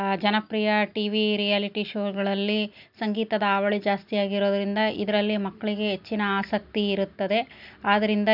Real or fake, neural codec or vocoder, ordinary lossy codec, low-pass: real; none; none; 5.4 kHz